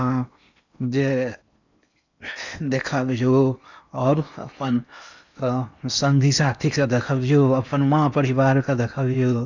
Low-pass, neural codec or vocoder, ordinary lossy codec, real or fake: 7.2 kHz; codec, 16 kHz in and 24 kHz out, 0.8 kbps, FocalCodec, streaming, 65536 codes; none; fake